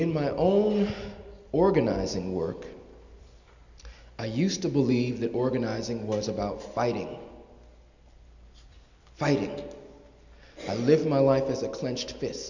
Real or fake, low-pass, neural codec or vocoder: real; 7.2 kHz; none